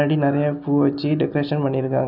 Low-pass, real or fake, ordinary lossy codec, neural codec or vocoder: 5.4 kHz; real; none; none